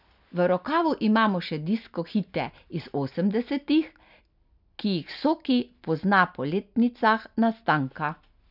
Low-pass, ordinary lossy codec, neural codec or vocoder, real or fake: 5.4 kHz; none; none; real